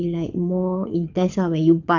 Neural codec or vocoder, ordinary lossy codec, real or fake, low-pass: codec, 24 kHz, 6 kbps, HILCodec; none; fake; 7.2 kHz